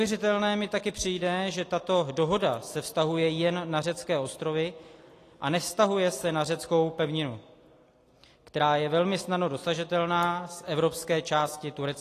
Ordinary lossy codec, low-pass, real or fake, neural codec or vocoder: AAC, 48 kbps; 14.4 kHz; real; none